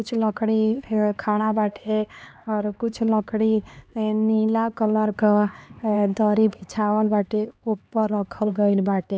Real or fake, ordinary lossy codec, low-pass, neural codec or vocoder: fake; none; none; codec, 16 kHz, 2 kbps, X-Codec, HuBERT features, trained on LibriSpeech